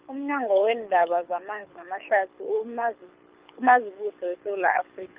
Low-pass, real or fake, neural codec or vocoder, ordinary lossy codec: 3.6 kHz; fake; codec, 24 kHz, 6 kbps, HILCodec; Opus, 32 kbps